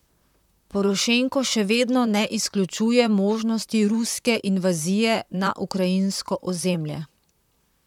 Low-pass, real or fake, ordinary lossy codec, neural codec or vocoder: 19.8 kHz; fake; none; vocoder, 44.1 kHz, 128 mel bands, Pupu-Vocoder